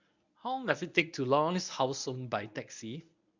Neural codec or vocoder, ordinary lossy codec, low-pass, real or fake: codec, 24 kHz, 0.9 kbps, WavTokenizer, medium speech release version 1; none; 7.2 kHz; fake